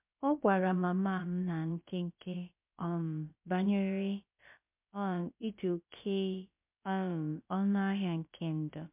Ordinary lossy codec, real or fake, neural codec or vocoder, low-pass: MP3, 32 kbps; fake; codec, 16 kHz, about 1 kbps, DyCAST, with the encoder's durations; 3.6 kHz